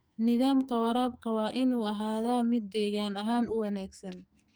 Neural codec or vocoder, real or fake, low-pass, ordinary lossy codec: codec, 44.1 kHz, 2.6 kbps, SNAC; fake; none; none